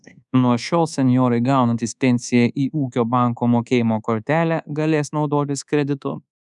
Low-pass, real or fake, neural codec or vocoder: 10.8 kHz; fake; codec, 24 kHz, 1.2 kbps, DualCodec